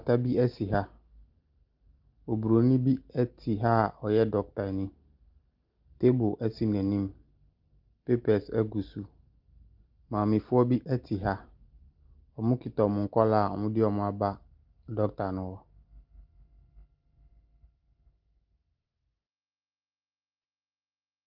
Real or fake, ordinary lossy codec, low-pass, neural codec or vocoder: real; Opus, 24 kbps; 5.4 kHz; none